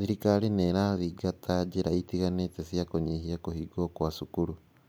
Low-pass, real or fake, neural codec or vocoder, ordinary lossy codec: none; real; none; none